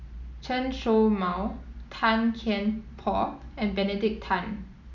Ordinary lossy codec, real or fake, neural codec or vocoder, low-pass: none; real; none; 7.2 kHz